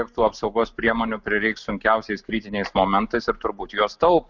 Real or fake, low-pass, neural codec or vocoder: real; 7.2 kHz; none